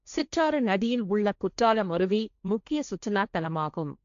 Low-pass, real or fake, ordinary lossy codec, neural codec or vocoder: 7.2 kHz; fake; MP3, 64 kbps; codec, 16 kHz, 1.1 kbps, Voila-Tokenizer